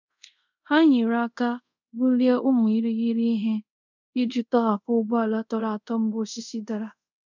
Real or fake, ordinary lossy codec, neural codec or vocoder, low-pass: fake; none; codec, 24 kHz, 0.5 kbps, DualCodec; 7.2 kHz